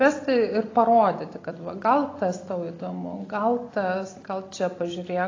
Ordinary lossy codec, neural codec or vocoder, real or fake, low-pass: AAC, 32 kbps; none; real; 7.2 kHz